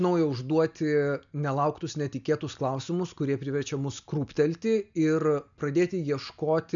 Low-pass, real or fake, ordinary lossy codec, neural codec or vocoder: 7.2 kHz; real; MP3, 96 kbps; none